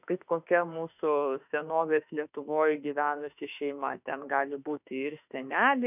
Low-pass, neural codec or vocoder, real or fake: 3.6 kHz; autoencoder, 48 kHz, 32 numbers a frame, DAC-VAE, trained on Japanese speech; fake